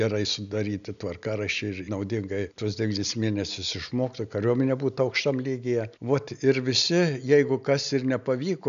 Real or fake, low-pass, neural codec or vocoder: real; 7.2 kHz; none